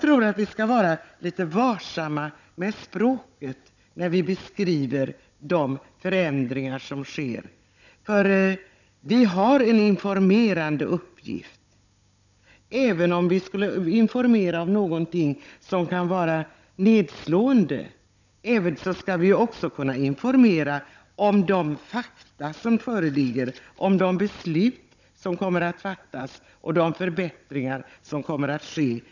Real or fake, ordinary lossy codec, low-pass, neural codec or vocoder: fake; none; 7.2 kHz; codec, 16 kHz, 16 kbps, FunCodec, trained on Chinese and English, 50 frames a second